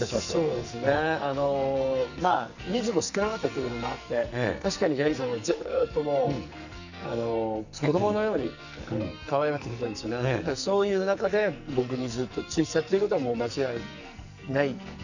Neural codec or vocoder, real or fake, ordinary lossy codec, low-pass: codec, 44.1 kHz, 2.6 kbps, SNAC; fake; none; 7.2 kHz